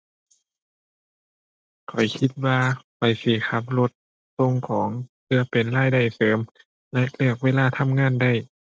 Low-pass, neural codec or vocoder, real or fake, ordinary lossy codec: none; none; real; none